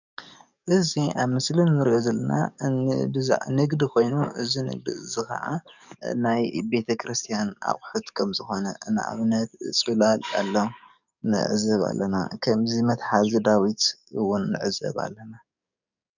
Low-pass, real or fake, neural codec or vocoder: 7.2 kHz; fake; codec, 44.1 kHz, 7.8 kbps, DAC